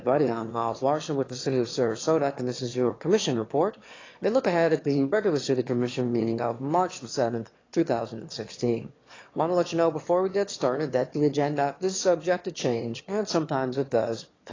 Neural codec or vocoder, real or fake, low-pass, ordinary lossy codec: autoencoder, 22.05 kHz, a latent of 192 numbers a frame, VITS, trained on one speaker; fake; 7.2 kHz; AAC, 32 kbps